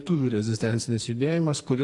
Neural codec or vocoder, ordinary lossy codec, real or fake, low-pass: codec, 44.1 kHz, 2.6 kbps, SNAC; AAC, 48 kbps; fake; 10.8 kHz